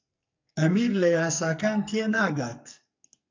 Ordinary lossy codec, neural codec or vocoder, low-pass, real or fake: MP3, 64 kbps; codec, 44.1 kHz, 2.6 kbps, SNAC; 7.2 kHz; fake